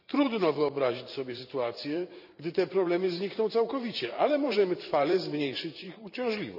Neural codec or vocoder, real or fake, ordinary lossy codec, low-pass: vocoder, 44.1 kHz, 128 mel bands every 512 samples, BigVGAN v2; fake; none; 5.4 kHz